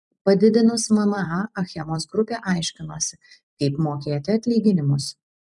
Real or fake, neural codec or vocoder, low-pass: fake; vocoder, 44.1 kHz, 128 mel bands every 256 samples, BigVGAN v2; 10.8 kHz